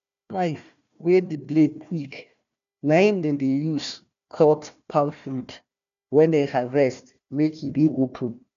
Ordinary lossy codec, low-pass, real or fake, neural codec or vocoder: none; 7.2 kHz; fake; codec, 16 kHz, 1 kbps, FunCodec, trained on Chinese and English, 50 frames a second